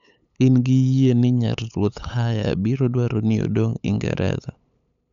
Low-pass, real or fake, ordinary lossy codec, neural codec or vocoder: 7.2 kHz; fake; none; codec, 16 kHz, 8 kbps, FunCodec, trained on LibriTTS, 25 frames a second